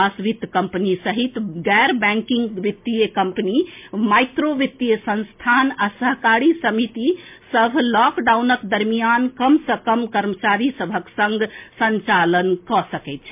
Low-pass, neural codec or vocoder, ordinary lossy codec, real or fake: 3.6 kHz; none; MP3, 32 kbps; real